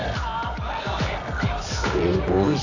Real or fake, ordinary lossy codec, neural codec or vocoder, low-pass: fake; none; codec, 24 kHz, 0.9 kbps, WavTokenizer, medium music audio release; 7.2 kHz